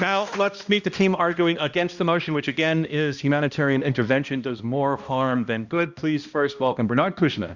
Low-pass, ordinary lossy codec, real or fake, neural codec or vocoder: 7.2 kHz; Opus, 64 kbps; fake; codec, 16 kHz, 1 kbps, X-Codec, HuBERT features, trained on balanced general audio